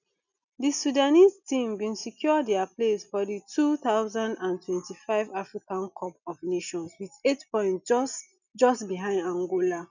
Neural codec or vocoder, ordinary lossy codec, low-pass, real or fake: none; none; 7.2 kHz; real